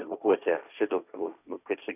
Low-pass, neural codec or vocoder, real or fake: 3.6 kHz; codec, 16 kHz, 1.1 kbps, Voila-Tokenizer; fake